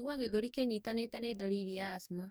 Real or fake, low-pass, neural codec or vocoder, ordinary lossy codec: fake; none; codec, 44.1 kHz, 2.6 kbps, DAC; none